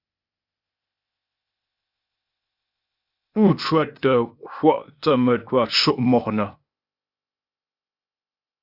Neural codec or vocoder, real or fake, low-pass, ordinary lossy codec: codec, 16 kHz, 0.8 kbps, ZipCodec; fake; 5.4 kHz; Opus, 64 kbps